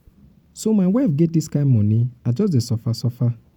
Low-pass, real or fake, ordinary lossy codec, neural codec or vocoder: 19.8 kHz; fake; none; vocoder, 44.1 kHz, 128 mel bands every 512 samples, BigVGAN v2